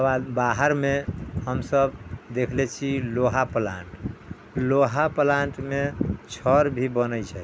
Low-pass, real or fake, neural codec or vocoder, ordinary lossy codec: none; real; none; none